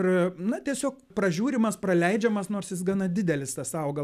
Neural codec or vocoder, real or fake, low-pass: none; real; 14.4 kHz